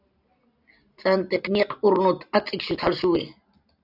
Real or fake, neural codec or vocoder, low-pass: real; none; 5.4 kHz